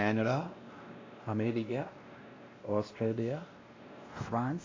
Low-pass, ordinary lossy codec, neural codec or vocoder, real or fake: 7.2 kHz; AAC, 32 kbps; codec, 16 kHz, 1 kbps, X-Codec, WavLM features, trained on Multilingual LibriSpeech; fake